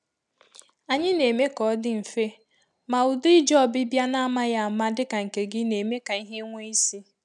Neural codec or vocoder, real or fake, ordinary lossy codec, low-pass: none; real; none; 10.8 kHz